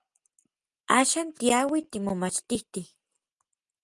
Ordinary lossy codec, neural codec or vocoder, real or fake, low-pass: Opus, 32 kbps; none; real; 10.8 kHz